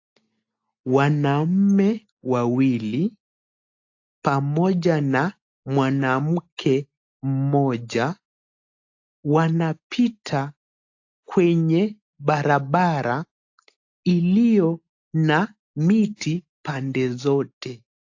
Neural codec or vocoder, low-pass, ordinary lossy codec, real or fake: none; 7.2 kHz; AAC, 48 kbps; real